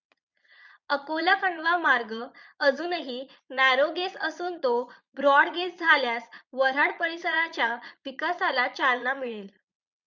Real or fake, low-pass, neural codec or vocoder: fake; 7.2 kHz; vocoder, 22.05 kHz, 80 mel bands, Vocos